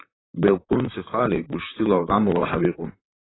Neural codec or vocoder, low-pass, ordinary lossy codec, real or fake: vocoder, 44.1 kHz, 128 mel bands, Pupu-Vocoder; 7.2 kHz; AAC, 16 kbps; fake